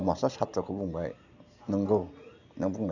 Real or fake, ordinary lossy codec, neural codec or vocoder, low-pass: real; none; none; 7.2 kHz